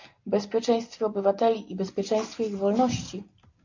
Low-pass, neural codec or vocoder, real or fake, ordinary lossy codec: 7.2 kHz; none; real; AAC, 48 kbps